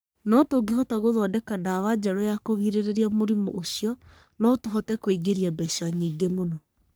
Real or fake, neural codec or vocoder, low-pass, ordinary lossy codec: fake; codec, 44.1 kHz, 3.4 kbps, Pupu-Codec; none; none